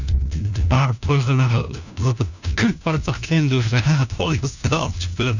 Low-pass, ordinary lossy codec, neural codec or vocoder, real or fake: 7.2 kHz; none; codec, 16 kHz, 1 kbps, FunCodec, trained on LibriTTS, 50 frames a second; fake